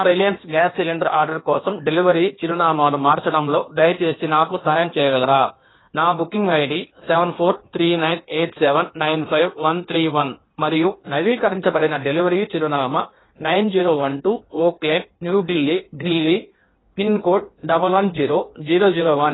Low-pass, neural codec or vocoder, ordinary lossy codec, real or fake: 7.2 kHz; codec, 16 kHz in and 24 kHz out, 1.1 kbps, FireRedTTS-2 codec; AAC, 16 kbps; fake